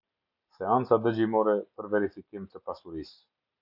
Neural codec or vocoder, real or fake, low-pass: none; real; 5.4 kHz